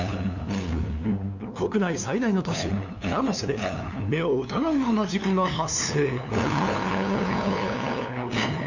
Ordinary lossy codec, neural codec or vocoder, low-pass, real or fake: none; codec, 16 kHz, 2 kbps, FunCodec, trained on LibriTTS, 25 frames a second; 7.2 kHz; fake